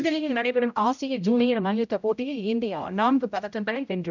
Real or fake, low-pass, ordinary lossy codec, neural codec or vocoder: fake; 7.2 kHz; none; codec, 16 kHz, 0.5 kbps, X-Codec, HuBERT features, trained on general audio